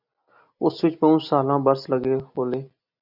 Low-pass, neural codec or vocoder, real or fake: 5.4 kHz; none; real